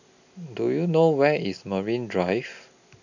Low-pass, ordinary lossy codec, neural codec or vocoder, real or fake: 7.2 kHz; none; none; real